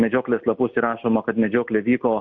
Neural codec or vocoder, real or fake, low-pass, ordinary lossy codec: none; real; 7.2 kHz; MP3, 48 kbps